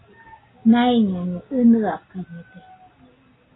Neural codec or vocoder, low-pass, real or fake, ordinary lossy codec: none; 7.2 kHz; real; AAC, 16 kbps